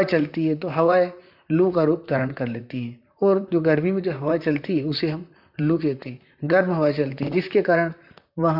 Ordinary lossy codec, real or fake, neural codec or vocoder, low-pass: AAC, 48 kbps; fake; vocoder, 44.1 kHz, 128 mel bands, Pupu-Vocoder; 5.4 kHz